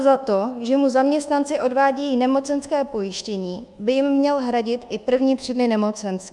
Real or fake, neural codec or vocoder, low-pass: fake; codec, 24 kHz, 1.2 kbps, DualCodec; 10.8 kHz